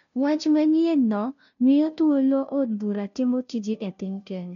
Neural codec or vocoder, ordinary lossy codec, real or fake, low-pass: codec, 16 kHz, 0.5 kbps, FunCodec, trained on Chinese and English, 25 frames a second; none; fake; 7.2 kHz